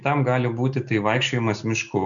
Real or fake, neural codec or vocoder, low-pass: real; none; 7.2 kHz